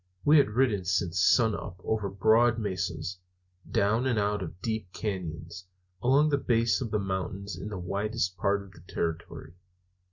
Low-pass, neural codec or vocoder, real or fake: 7.2 kHz; none; real